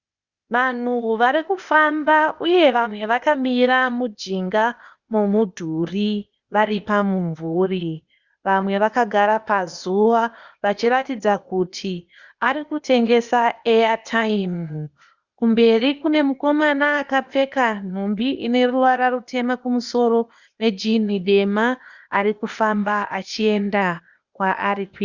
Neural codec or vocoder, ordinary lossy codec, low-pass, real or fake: codec, 16 kHz, 0.8 kbps, ZipCodec; Opus, 64 kbps; 7.2 kHz; fake